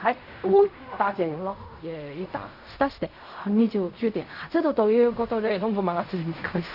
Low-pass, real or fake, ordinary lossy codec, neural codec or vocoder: 5.4 kHz; fake; none; codec, 16 kHz in and 24 kHz out, 0.4 kbps, LongCat-Audio-Codec, fine tuned four codebook decoder